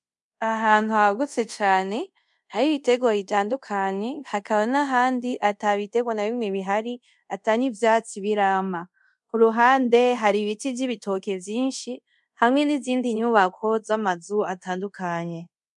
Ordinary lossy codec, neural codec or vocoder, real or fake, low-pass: MP3, 64 kbps; codec, 24 kHz, 0.5 kbps, DualCodec; fake; 10.8 kHz